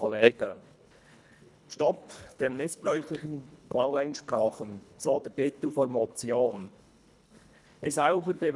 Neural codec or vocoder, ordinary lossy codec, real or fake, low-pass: codec, 24 kHz, 1.5 kbps, HILCodec; none; fake; none